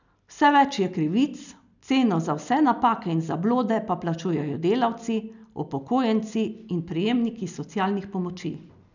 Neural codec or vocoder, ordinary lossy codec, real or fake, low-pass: none; none; real; 7.2 kHz